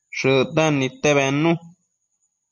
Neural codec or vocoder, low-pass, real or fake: none; 7.2 kHz; real